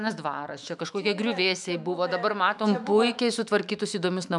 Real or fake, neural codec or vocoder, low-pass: fake; autoencoder, 48 kHz, 128 numbers a frame, DAC-VAE, trained on Japanese speech; 10.8 kHz